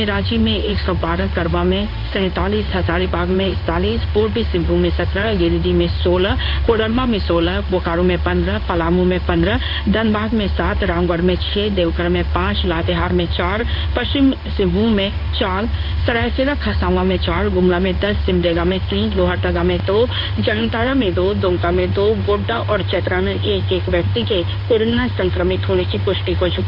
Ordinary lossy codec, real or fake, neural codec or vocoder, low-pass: none; fake; codec, 16 kHz in and 24 kHz out, 1 kbps, XY-Tokenizer; 5.4 kHz